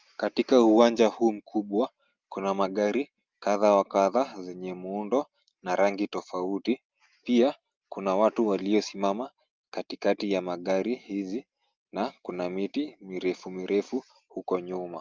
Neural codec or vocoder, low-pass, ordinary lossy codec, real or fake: none; 7.2 kHz; Opus, 24 kbps; real